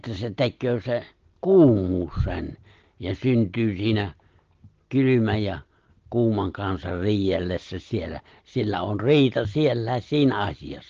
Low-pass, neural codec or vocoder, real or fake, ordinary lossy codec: 7.2 kHz; none; real; Opus, 16 kbps